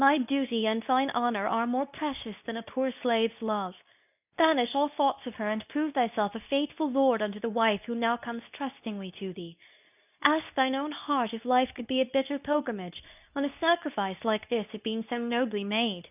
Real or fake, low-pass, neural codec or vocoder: fake; 3.6 kHz; codec, 24 kHz, 0.9 kbps, WavTokenizer, medium speech release version 2